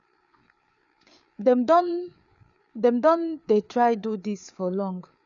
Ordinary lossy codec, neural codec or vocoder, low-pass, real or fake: none; codec, 16 kHz, 4 kbps, FreqCodec, larger model; 7.2 kHz; fake